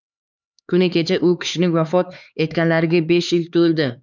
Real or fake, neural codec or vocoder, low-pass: fake; codec, 16 kHz, 4 kbps, X-Codec, HuBERT features, trained on LibriSpeech; 7.2 kHz